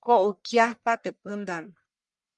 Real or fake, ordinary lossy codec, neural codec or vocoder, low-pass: fake; MP3, 96 kbps; codec, 44.1 kHz, 1.7 kbps, Pupu-Codec; 10.8 kHz